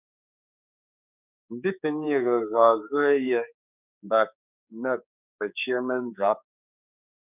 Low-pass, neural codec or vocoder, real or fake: 3.6 kHz; codec, 16 kHz, 4 kbps, X-Codec, HuBERT features, trained on general audio; fake